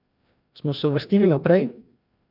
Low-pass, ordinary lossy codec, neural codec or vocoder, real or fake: 5.4 kHz; none; codec, 16 kHz, 1 kbps, FreqCodec, larger model; fake